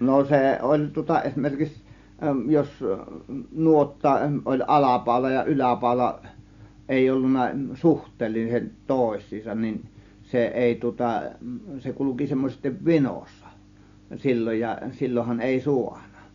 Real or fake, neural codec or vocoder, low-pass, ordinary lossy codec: real; none; 7.2 kHz; Opus, 64 kbps